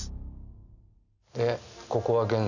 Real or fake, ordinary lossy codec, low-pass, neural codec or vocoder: real; none; 7.2 kHz; none